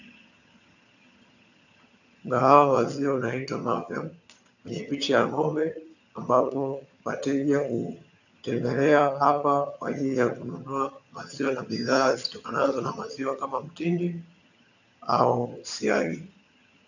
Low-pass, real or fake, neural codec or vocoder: 7.2 kHz; fake; vocoder, 22.05 kHz, 80 mel bands, HiFi-GAN